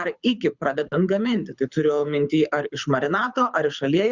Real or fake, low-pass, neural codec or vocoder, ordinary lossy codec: fake; 7.2 kHz; codec, 24 kHz, 6 kbps, HILCodec; Opus, 64 kbps